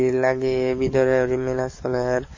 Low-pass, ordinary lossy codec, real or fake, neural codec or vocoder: 7.2 kHz; MP3, 32 kbps; fake; codec, 44.1 kHz, 7.8 kbps, DAC